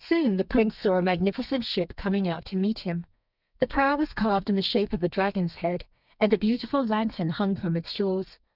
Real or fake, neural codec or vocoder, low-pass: fake; codec, 32 kHz, 1.9 kbps, SNAC; 5.4 kHz